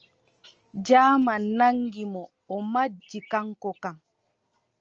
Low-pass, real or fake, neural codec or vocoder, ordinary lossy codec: 7.2 kHz; real; none; Opus, 24 kbps